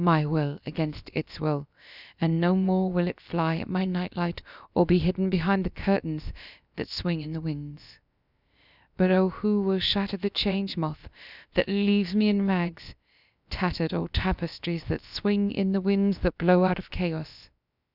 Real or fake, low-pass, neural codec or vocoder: fake; 5.4 kHz; codec, 16 kHz, about 1 kbps, DyCAST, with the encoder's durations